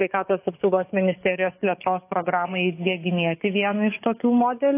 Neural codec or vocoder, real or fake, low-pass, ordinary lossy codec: codec, 44.1 kHz, 7.8 kbps, DAC; fake; 3.6 kHz; AAC, 24 kbps